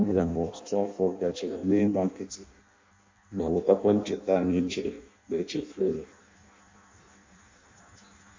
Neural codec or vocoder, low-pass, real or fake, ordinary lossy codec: codec, 16 kHz in and 24 kHz out, 0.6 kbps, FireRedTTS-2 codec; 7.2 kHz; fake; MP3, 48 kbps